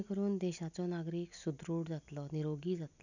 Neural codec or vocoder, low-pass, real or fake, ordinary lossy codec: none; 7.2 kHz; real; none